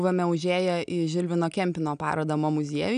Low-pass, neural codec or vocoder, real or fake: 9.9 kHz; none; real